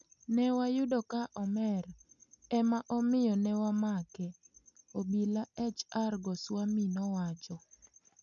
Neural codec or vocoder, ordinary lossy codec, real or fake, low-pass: none; none; real; 7.2 kHz